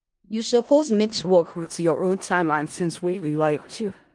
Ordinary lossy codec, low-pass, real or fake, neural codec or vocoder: Opus, 24 kbps; 10.8 kHz; fake; codec, 16 kHz in and 24 kHz out, 0.4 kbps, LongCat-Audio-Codec, four codebook decoder